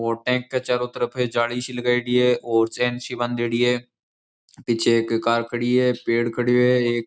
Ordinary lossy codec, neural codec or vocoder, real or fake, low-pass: none; none; real; none